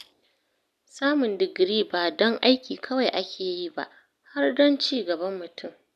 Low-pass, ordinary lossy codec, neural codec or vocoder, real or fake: 14.4 kHz; none; none; real